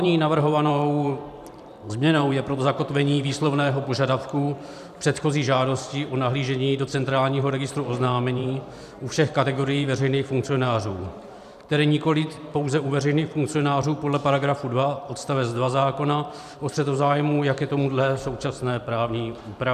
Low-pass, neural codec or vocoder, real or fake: 14.4 kHz; vocoder, 44.1 kHz, 128 mel bands every 512 samples, BigVGAN v2; fake